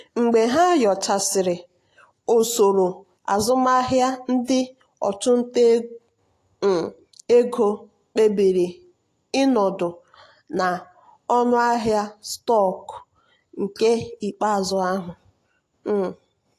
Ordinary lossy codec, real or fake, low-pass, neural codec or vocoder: AAC, 48 kbps; real; 14.4 kHz; none